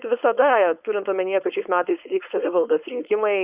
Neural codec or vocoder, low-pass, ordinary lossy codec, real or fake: codec, 16 kHz, 4.8 kbps, FACodec; 3.6 kHz; Opus, 64 kbps; fake